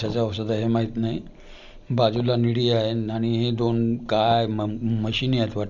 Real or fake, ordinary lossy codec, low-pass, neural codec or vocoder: real; none; 7.2 kHz; none